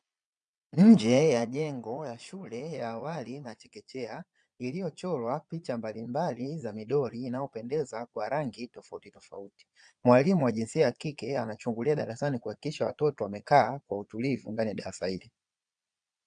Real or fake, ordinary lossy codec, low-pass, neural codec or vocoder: fake; MP3, 96 kbps; 9.9 kHz; vocoder, 22.05 kHz, 80 mel bands, Vocos